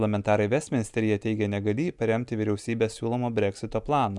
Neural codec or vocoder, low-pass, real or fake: none; 10.8 kHz; real